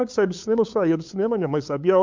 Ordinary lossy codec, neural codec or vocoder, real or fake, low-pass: MP3, 64 kbps; codec, 16 kHz, 8 kbps, FunCodec, trained on LibriTTS, 25 frames a second; fake; 7.2 kHz